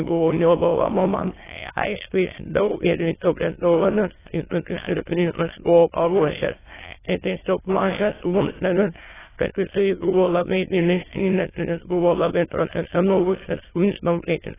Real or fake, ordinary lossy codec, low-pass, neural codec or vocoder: fake; AAC, 16 kbps; 3.6 kHz; autoencoder, 22.05 kHz, a latent of 192 numbers a frame, VITS, trained on many speakers